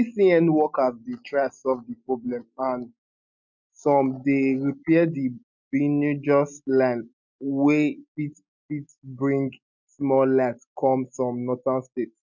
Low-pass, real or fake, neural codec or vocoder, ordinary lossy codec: 7.2 kHz; real; none; MP3, 64 kbps